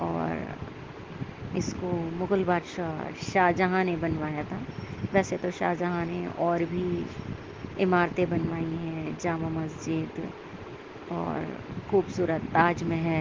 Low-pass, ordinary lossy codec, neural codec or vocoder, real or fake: 7.2 kHz; Opus, 16 kbps; none; real